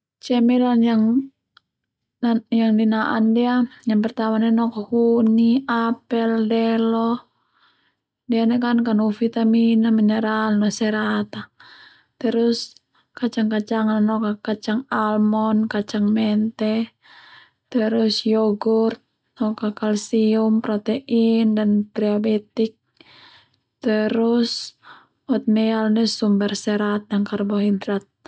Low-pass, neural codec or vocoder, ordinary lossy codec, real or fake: none; none; none; real